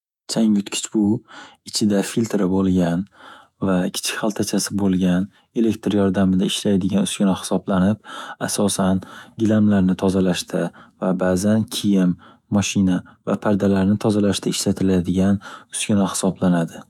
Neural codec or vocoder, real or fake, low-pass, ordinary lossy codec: autoencoder, 48 kHz, 128 numbers a frame, DAC-VAE, trained on Japanese speech; fake; 19.8 kHz; none